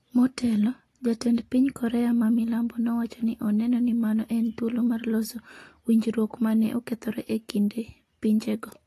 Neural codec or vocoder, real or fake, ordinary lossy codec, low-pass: none; real; AAC, 48 kbps; 14.4 kHz